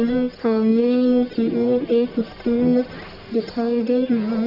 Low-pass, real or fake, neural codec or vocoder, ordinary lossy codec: 5.4 kHz; fake; codec, 44.1 kHz, 1.7 kbps, Pupu-Codec; none